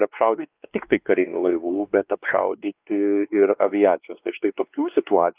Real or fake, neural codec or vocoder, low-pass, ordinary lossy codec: fake; codec, 16 kHz, 2 kbps, X-Codec, WavLM features, trained on Multilingual LibriSpeech; 3.6 kHz; Opus, 32 kbps